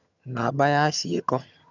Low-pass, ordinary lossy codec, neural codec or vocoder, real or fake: 7.2 kHz; none; vocoder, 22.05 kHz, 80 mel bands, HiFi-GAN; fake